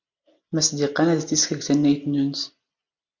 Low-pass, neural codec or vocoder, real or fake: 7.2 kHz; none; real